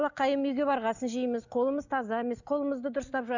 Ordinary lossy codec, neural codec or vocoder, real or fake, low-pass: none; none; real; 7.2 kHz